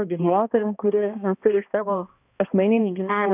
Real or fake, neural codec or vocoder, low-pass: fake; codec, 16 kHz, 1 kbps, X-Codec, HuBERT features, trained on balanced general audio; 3.6 kHz